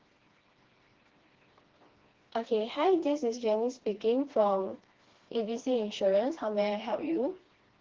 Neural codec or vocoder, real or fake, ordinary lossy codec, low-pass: codec, 16 kHz, 2 kbps, FreqCodec, smaller model; fake; Opus, 16 kbps; 7.2 kHz